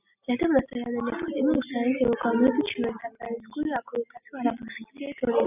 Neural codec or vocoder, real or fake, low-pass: none; real; 3.6 kHz